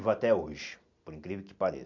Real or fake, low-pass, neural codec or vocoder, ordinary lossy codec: real; 7.2 kHz; none; MP3, 64 kbps